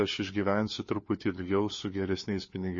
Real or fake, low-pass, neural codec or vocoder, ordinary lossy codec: fake; 7.2 kHz; codec, 16 kHz, 4 kbps, FunCodec, trained on LibriTTS, 50 frames a second; MP3, 32 kbps